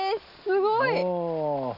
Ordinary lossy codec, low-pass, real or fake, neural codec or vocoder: none; 5.4 kHz; real; none